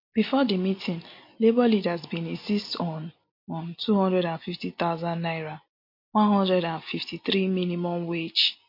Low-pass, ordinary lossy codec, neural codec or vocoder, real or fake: 5.4 kHz; MP3, 32 kbps; none; real